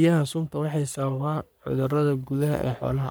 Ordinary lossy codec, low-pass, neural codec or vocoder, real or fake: none; none; codec, 44.1 kHz, 3.4 kbps, Pupu-Codec; fake